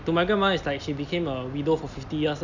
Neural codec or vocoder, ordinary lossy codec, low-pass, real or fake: none; none; 7.2 kHz; real